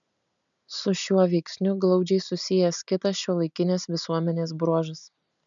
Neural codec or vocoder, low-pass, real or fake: none; 7.2 kHz; real